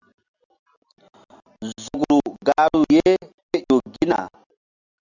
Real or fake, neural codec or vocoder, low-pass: real; none; 7.2 kHz